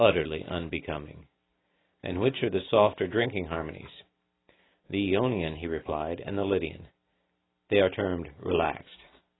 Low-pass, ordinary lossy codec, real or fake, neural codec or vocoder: 7.2 kHz; AAC, 16 kbps; real; none